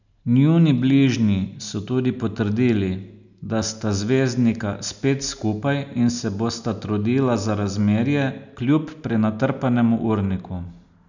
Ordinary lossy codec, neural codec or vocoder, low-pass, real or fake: none; none; 7.2 kHz; real